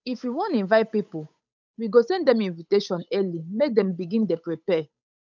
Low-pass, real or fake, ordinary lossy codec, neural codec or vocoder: 7.2 kHz; fake; none; codec, 16 kHz, 8 kbps, FunCodec, trained on Chinese and English, 25 frames a second